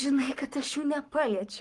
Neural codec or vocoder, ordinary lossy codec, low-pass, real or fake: codec, 44.1 kHz, 7.8 kbps, Pupu-Codec; Opus, 24 kbps; 10.8 kHz; fake